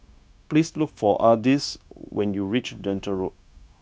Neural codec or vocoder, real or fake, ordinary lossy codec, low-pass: codec, 16 kHz, 0.9 kbps, LongCat-Audio-Codec; fake; none; none